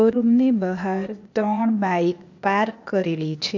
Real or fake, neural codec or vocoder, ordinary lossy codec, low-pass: fake; codec, 16 kHz, 0.8 kbps, ZipCodec; AAC, 48 kbps; 7.2 kHz